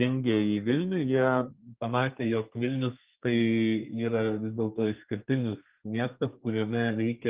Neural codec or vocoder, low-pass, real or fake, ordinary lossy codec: codec, 32 kHz, 1.9 kbps, SNAC; 3.6 kHz; fake; Opus, 24 kbps